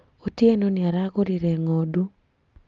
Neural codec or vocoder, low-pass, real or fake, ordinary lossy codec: none; 7.2 kHz; real; Opus, 32 kbps